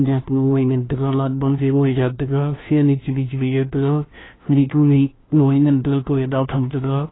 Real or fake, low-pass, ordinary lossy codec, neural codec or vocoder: fake; 7.2 kHz; AAC, 16 kbps; codec, 16 kHz, 1 kbps, FunCodec, trained on LibriTTS, 50 frames a second